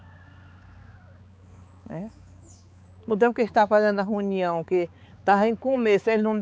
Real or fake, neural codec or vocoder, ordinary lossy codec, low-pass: fake; codec, 16 kHz, 4 kbps, X-Codec, HuBERT features, trained on balanced general audio; none; none